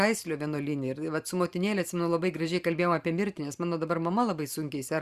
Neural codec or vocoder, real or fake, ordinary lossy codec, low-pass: vocoder, 44.1 kHz, 128 mel bands every 512 samples, BigVGAN v2; fake; Opus, 64 kbps; 14.4 kHz